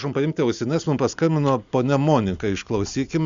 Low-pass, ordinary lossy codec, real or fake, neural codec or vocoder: 7.2 kHz; Opus, 64 kbps; real; none